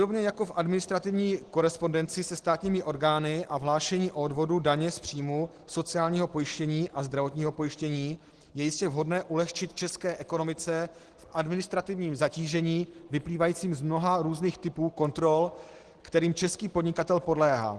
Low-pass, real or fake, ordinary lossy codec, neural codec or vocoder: 10.8 kHz; real; Opus, 16 kbps; none